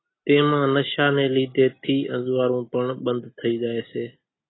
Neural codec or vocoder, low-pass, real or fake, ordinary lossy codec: none; 7.2 kHz; real; AAC, 16 kbps